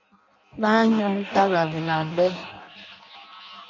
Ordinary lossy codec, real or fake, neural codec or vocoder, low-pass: MP3, 48 kbps; fake; codec, 16 kHz in and 24 kHz out, 0.6 kbps, FireRedTTS-2 codec; 7.2 kHz